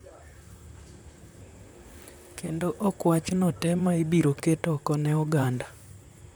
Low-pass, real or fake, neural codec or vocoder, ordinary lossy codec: none; fake; vocoder, 44.1 kHz, 128 mel bands, Pupu-Vocoder; none